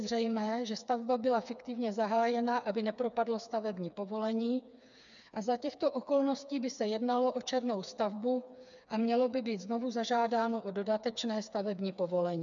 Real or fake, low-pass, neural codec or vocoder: fake; 7.2 kHz; codec, 16 kHz, 4 kbps, FreqCodec, smaller model